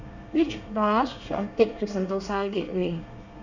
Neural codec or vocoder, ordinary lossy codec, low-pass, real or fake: codec, 24 kHz, 1 kbps, SNAC; none; 7.2 kHz; fake